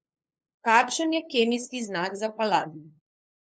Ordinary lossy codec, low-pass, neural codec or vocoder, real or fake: none; none; codec, 16 kHz, 2 kbps, FunCodec, trained on LibriTTS, 25 frames a second; fake